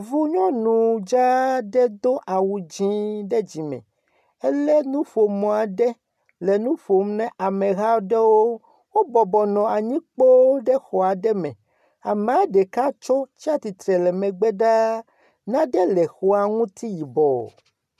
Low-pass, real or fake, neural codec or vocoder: 14.4 kHz; real; none